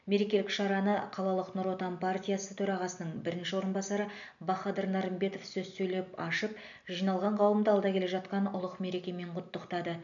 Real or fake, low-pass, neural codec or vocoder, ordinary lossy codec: real; 7.2 kHz; none; none